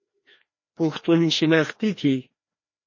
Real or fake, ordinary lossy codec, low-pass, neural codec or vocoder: fake; MP3, 32 kbps; 7.2 kHz; codec, 16 kHz, 1 kbps, FreqCodec, larger model